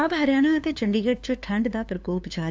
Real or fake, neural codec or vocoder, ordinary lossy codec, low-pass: fake; codec, 16 kHz, 2 kbps, FunCodec, trained on LibriTTS, 25 frames a second; none; none